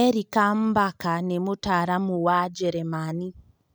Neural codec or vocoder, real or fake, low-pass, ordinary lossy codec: none; real; none; none